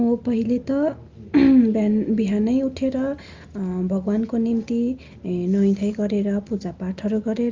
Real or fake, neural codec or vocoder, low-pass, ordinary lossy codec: real; none; 7.2 kHz; Opus, 32 kbps